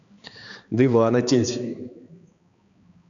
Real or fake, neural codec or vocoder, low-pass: fake; codec, 16 kHz, 2 kbps, X-Codec, HuBERT features, trained on balanced general audio; 7.2 kHz